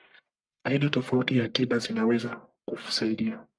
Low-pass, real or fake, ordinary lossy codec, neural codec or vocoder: 9.9 kHz; fake; MP3, 96 kbps; codec, 44.1 kHz, 1.7 kbps, Pupu-Codec